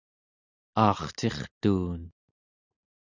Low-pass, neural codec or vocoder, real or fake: 7.2 kHz; none; real